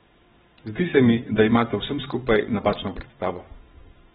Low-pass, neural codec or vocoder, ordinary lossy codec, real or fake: 9.9 kHz; vocoder, 22.05 kHz, 80 mel bands, WaveNeXt; AAC, 16 kbps; fake